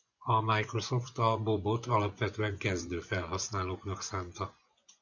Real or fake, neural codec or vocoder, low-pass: fake; vocoder, 24 kHz, 100 mel bands, Vocos; 7.2 kHz